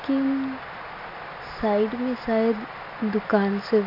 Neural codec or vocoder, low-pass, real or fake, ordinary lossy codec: none; 5.4 kHz; real; MP3, 48 kbps